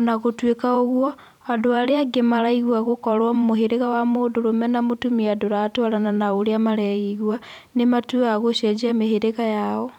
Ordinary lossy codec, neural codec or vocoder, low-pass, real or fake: none; vocoder, 44.1 kHz, 128 mel bands every 512 samples, BigVGAN v2; 19.8 kHz; fake